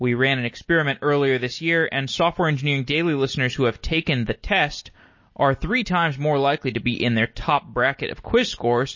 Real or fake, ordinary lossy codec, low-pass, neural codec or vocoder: real; MP3, 32 kbps; 7.2 kHz; none